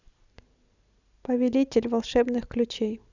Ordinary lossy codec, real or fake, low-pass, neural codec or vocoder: none; real; 7.2 kHz; none